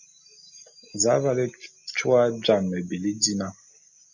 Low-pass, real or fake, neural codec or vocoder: 7.2 kHz; real; none